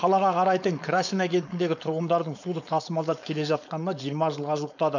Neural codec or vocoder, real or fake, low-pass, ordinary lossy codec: codec, 16 kHz, 4.8 kbps, FACodec; fake; 7.2 kHz; none